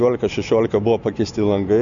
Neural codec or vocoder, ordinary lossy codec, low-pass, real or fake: none; Opus, 64 kbps; 7.2 kHz; real